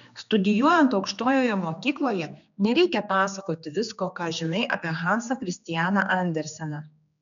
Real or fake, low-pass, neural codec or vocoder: fake; 7.2 kHz; codec, 16 kHz, 2 kbps, X-Codec, HuBERT features, trained on general audio